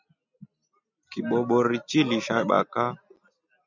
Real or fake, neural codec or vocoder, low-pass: real; none; 7.2 kHz